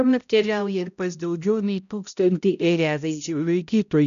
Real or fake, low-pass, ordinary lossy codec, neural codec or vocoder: fake; 7.2 kHz; MP3, 96 kbps; codec, 16 kHz, 0.5 kbps, X-Codec, HuBERT features, trained on balanced general audio